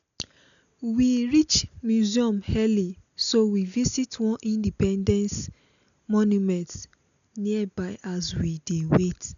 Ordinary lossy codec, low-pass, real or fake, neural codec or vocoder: none; 7.2 kHz; real; none